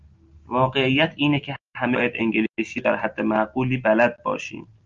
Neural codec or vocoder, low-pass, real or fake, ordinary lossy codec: none; 7.2 kHz; real; Opus, 32 kbps